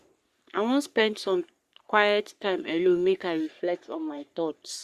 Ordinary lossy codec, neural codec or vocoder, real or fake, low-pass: Opus, 64 kbps; codec, 44.1 kHz, 3.4 kbps, Pupu-Codec; fake; 14.4 kHz